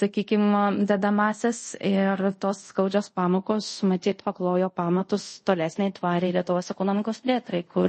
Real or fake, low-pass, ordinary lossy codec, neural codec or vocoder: fake; 10.8 kHz; MP3, 32 kbps; codec, 24 kHz, 0.5 kbps, DualCodec